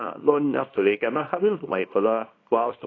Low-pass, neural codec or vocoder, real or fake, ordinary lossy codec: 7.2 kHz; codec, 24 kHz, 0.9 kbps, WavTokenizer, small release; fake; AAC, 32 kbps